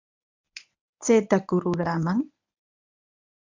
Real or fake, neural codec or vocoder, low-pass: fake; codec, 24 kHz, 0.9 kbps, WavTokenizer, medium speech release version 1; 7.2 kHz